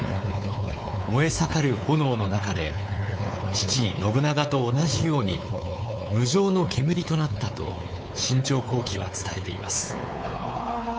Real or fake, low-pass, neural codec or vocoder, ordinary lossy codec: fake; none; codec, 16 kHz, 4 kbps, X-Codec, WavLM features, trained on Multilingual LibriSpeech; none